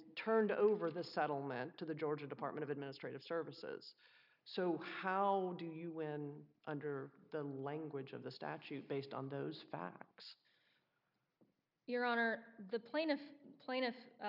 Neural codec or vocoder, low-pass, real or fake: none; 5.4 kHz; real